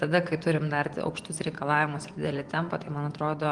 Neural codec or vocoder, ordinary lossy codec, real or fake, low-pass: vocoder, 24 kHz, 100 mel bands, Vocos; Opus, 24 kbps; fake; 10.8 kHz